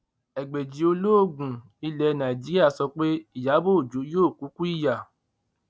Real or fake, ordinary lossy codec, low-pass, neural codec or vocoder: real; none; none; none